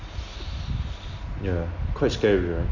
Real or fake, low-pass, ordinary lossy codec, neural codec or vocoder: real; 7.2 kHz; none; none